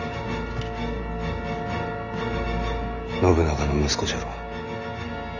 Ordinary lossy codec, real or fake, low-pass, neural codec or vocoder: none; real; 7.2 kHz; none